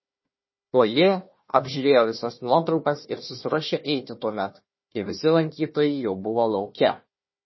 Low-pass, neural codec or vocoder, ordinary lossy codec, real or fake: 7.2 kHz; codec, 16 kHz, 1 kbps, FunCodec, trained on Chinese and English, 50 frames a second; MP3, 24 kbps; fake